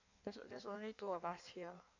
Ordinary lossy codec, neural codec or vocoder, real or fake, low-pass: AAC, 48 kbps; codec, 16 kHz in and 24 kHz out, 1.1 kbps, FireRedTTS-2 codec; fake; 7.2 kHz